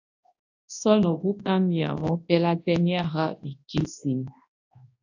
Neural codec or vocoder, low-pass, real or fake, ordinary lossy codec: codec, 24 kHz, 0.9 kbps, WavTokenizer, large speech release; 7.2 kHz; fake; AAC, 48 kbps